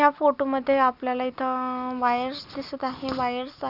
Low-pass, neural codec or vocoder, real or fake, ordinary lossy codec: 5.4 kHz; none; real; none